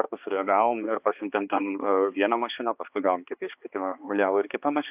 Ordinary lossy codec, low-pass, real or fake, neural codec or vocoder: AAC, 32 kbps; 3.6 kHz; fake; codec, 16 kHz, 2 kbps, X-Codec, HuBERT features, trained on balanced general audio